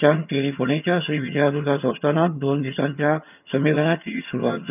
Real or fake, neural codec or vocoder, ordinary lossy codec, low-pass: fake; vocoder, 22.05 kHz, 80 mel bands, HiFi-GAN; none; 3.6 kHz